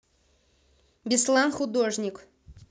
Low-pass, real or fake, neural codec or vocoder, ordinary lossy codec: none; real; none; none